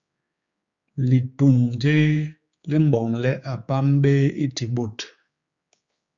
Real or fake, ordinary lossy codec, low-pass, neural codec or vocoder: fake; Opus, 64 kbps; 7.2 kHz; codec, 16 kHz, 4 kbps, X-Codec, HuBERT features, trained on general audio